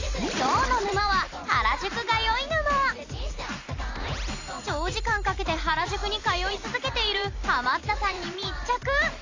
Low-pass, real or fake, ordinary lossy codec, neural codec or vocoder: 7.2 kHz; fake; AAC, 48 kbps; vocoder, 44.1 kHz, 128 mel bands every 256 samples, BigVGAN v2